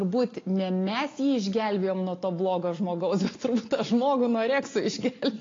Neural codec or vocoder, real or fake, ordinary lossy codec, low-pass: none; real; AAC, 32 kbps; 7.2 kHz